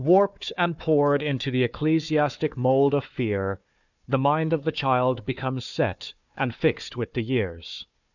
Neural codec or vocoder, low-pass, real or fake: codec, 16 kHz, 4 kbps, FunCodec, trained on Chinese and English, 50 frames a second; 7.2 kHz; fake